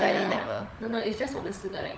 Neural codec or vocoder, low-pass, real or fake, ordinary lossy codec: codec, 16 kHz, 16 kbps, FunCodec, trained on LibriTTS, 50 frames a second; none; fake; none